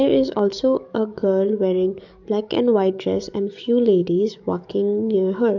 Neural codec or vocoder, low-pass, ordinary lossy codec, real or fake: codec, 44.1 kHz, 7.8 kbps, DAC; 7.2 kHz; none; fake